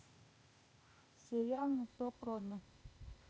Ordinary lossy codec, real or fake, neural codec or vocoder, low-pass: none; fake; codec, 16 kHz, 0.8 kbps, ZipCodec; none